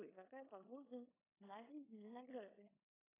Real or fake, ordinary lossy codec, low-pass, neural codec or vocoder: fake; AAC, 16 kbps; 3.6 kHz; codec, 16 kHz in and 24 kHz out, 0.9 kbps, LongCat-Audio-Codec, four codebook decoder